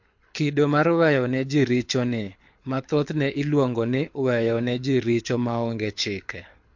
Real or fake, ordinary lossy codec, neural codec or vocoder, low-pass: fake; MP3, 48 kbps; codec, 24 kHz, 6 kbps, HILCodec; 7.2 kHz